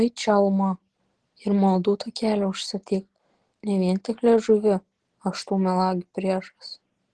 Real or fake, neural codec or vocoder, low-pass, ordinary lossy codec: real; none; 10.8 kHz; Opus, 16 kbps